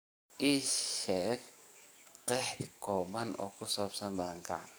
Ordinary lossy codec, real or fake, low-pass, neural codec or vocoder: none; fake; none; codec, 44.1 kHz, 7.8 kbps, DAC